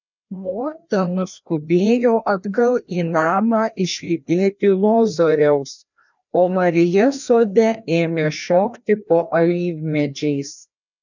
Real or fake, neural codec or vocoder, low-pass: fake; codec, 16 kHz, 1 kbps, FreqCodec, larger model; 7.2 kHz